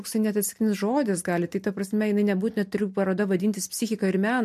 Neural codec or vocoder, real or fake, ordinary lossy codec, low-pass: none; real; MP3, 64 kbps; 14.4 kHz